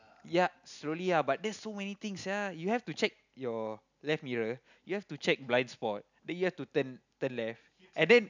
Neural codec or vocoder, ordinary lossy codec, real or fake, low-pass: none; none; real; 7.2 kHz